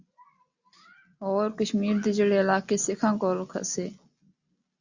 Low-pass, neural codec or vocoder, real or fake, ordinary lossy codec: 7.2 kHz; none; real; Opus, 64 kbps